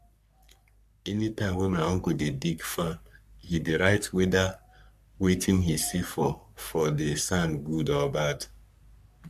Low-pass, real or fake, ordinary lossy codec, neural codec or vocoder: 14.4 kHz; fake; MP3, 96 kbps; codec, 44.1 kHz, 3.4 kbps, Pupu-Codec